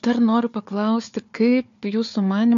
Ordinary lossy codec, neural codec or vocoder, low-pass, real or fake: AAC, 64 kbps; codec, 16 kHz, 4 kbps, FunCodec, trained on Chinese and English, 50 frames a second; 7.2 kHz; fake